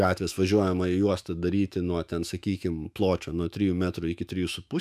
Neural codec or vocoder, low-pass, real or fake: autoencoder, 48 kHz, 128 numbers a frame, DAC-VAE, trained on Japanese speech; 14.4 kHz; fake